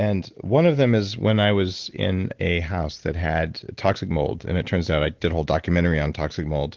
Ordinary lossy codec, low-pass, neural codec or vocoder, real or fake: Opus, 16 kbps; 7.2 kHz; none; real